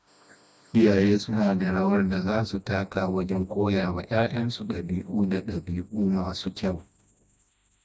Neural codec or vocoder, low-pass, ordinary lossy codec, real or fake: codec, 16 kHz, 1 kbps, FreqCodec, smaller model; none; none; fake